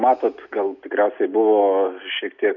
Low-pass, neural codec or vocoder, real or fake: 7.2 kHz; none; real